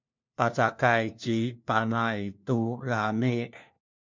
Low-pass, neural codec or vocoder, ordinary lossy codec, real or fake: 7.2 kHz; codec, 16 kHz, 1 kbps, FunCodec, trained on LibriTTS, 50 frames a second; MP3, 64 kbps; fake